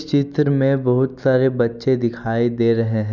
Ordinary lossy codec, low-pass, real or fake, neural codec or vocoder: none; 7.2 kHz; real; none